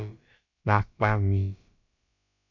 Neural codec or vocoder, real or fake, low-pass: codec, 16 kHz, about 1 kbps, DyCAST, with the encoder's durations; fake; 7.2 kHz